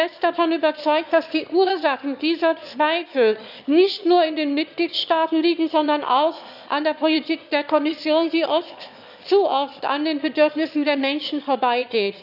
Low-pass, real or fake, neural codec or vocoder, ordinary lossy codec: 5.4 kHz; fake; autoencoder, 22.05 kHz, a latent of 192 numbers a frame, VITS, trained on one speaker; none